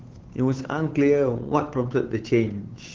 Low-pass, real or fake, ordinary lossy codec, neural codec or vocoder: 7.2 kHz; fake; Opus, 16 kbps; codec, 16 kHz, 8 kbps, FunCodec, trained on Chinese and English, 25 frames a second